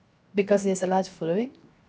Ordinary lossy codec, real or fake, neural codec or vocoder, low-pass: none; fake; codec, 16 kHz, 0.7 kbps, FocalCodec; none